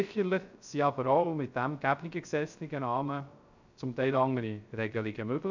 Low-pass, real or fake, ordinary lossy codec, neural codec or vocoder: 7.2 kHz; fake; none; codec, 16 kHz, 0.3 kbps, FocalCodec